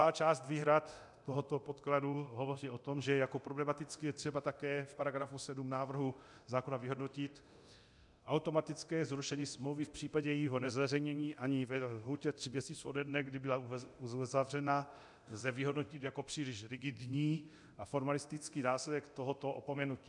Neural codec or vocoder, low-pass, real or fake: codec, 24 kHz, 0.9 kbps, DualCodec; 10.8 kHz; fake